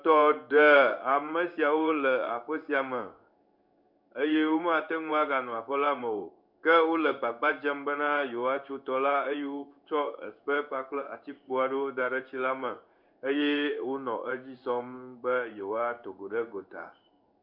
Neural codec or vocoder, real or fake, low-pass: codec, 16 kHz in and 24 kHz out, 1 kbps, XY-Tokenizer; fake; 5.4 kHz